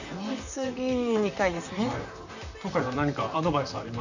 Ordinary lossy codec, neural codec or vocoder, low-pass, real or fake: none; vocoder, 44.1 kHz, 128 mel bands, Pupu-Vocoder; 7.2 kHz; fake